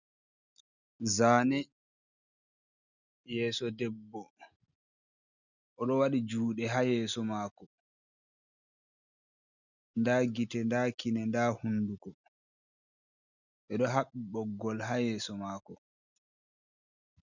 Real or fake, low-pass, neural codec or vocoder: real; 7.2 kHz; none